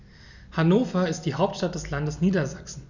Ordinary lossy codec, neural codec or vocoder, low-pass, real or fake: none; none; 7.2 kHz; real